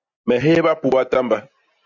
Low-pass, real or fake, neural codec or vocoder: 7.2 kHz; real; none